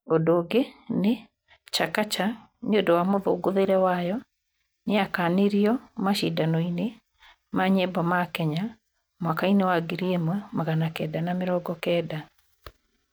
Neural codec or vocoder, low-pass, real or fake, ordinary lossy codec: none; none; real; none